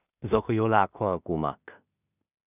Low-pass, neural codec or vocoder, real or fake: 3.6 kHz; codec, 16 kHz in and 24 kHz out, 0.4 kbps, LongCat-Audio-Codec, two codebook decoder; fake